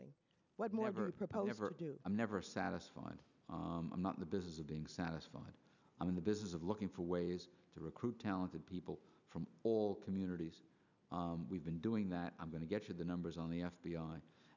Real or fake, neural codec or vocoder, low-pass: real; none; 7.2 kHz